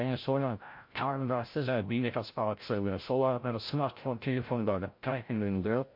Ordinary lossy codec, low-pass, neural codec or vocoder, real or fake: MP3, 32 kbps; 5.4 kHz; codec, 16 kHz, 0.5 kbps, FreqCodec, larger model; fake